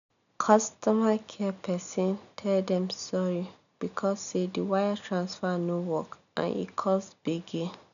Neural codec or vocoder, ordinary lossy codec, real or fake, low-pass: none; none; real; 7.2 kHz